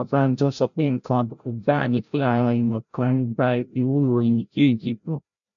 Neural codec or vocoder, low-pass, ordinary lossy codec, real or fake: codec, 16 kHz, 0.5 kbps, FreqCodec, larger model; 7.2 kHz; none; fake